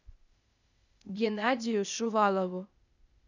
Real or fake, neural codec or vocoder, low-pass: fake; codec, 16 kHz, 0.8 kbps, ZipCodec; 7.2 kHz